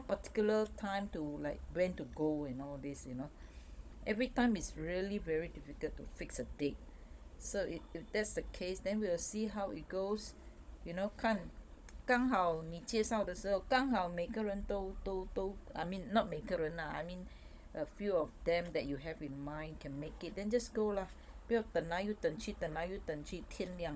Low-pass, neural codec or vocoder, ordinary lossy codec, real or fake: none; codec, 16 kHz, 16 kbps, FunCodec, trained on Chinese and English, 50 frames a second; none; fake